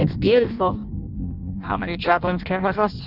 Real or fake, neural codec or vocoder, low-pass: fake; codec, 16 kHz in and 24 kHz out, 0.6 kbps, FireRedTTS-2 codec; 5.4 kHz